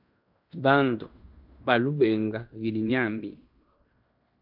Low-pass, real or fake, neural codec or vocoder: 5.4 kHz; fake; codec, 16 kHz in and 24 kHz out, 0.9 kbps, LongCat-Audio-Codec, fine tuned four codebook decoder